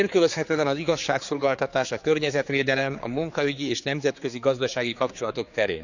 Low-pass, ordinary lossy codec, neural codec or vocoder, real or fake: 7.2 kHz; none; codec, 16 kHz, 4 kbps, X-Codec, HuBERT features, trained on general audio; fake